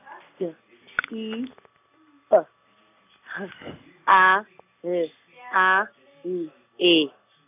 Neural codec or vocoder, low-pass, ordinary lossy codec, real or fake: none; 3.6 kHz; none; real